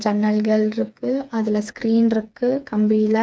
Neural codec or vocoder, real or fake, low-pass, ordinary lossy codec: codec, 16 kHz, 4 kbps, FreqCodec, smaller model; fake; none; none